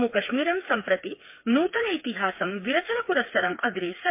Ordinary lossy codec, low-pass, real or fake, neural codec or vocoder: MP3, 24 kbps; 3.6 kHz; fake; codec, 16 kHz, 4 kbps, FreqCodec, smaller model